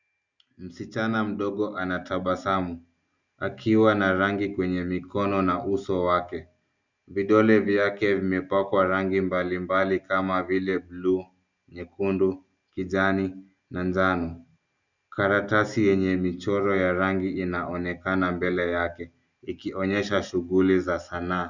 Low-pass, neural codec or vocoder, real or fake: 7.2 kHz; none; real